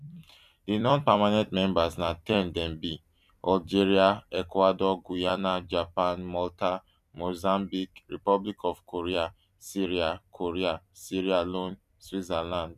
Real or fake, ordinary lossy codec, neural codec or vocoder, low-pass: fake; none; vocoder, 44.1 kHz, 128 mel bands every 256 samples, BigVGAN v2; 14.4 kHz